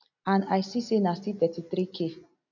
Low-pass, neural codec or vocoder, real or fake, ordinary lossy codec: 7.2 kHz; none; real; none